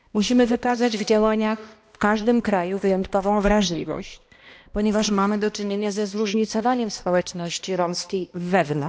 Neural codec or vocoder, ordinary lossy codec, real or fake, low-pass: codec, 16 kHz, 1 kbps, X-Codec, HuBERT features, trained on balanced general audio; none; fake; none